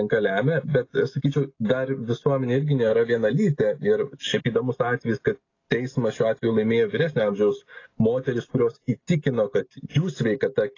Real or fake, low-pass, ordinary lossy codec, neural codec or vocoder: real; 7.2 kHz; AAC, 32 kbps; none